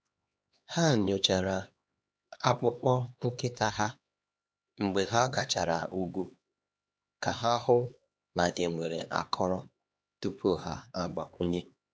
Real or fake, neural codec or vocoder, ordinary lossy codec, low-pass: fake; codec, 16 kHz, 2 kbps, X-Codec, HuBERT features, trained on LibriSpeech; none; none